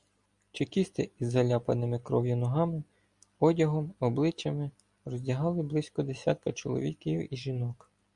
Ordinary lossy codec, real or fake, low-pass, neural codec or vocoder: Opus, 64 kbps; real; 10.8 kHz; none